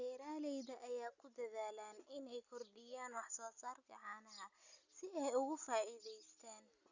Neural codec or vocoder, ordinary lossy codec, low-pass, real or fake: none; Opus, 64 kbps; 7.2 kHz; real